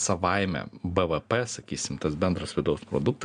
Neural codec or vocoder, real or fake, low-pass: none; real; 9.9 kHz